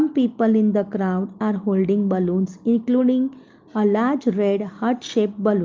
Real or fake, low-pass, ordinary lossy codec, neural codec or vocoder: real; 7.2 kHz; Opus, 24 kbps; none